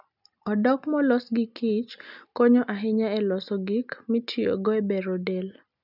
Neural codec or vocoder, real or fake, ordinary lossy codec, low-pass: none; real; none; 5.4 kHz